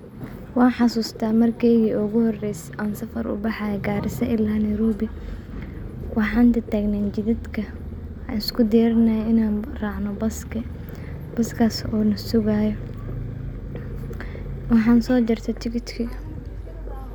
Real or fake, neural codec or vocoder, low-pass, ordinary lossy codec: real; none; 19.8 kHz; none